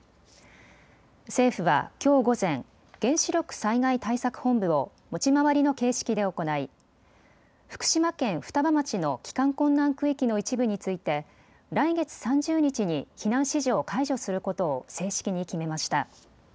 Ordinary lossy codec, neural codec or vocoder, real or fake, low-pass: none; none; real; none